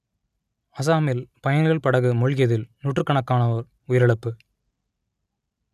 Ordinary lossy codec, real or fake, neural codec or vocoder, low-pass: none; real; none; 14.4 kHz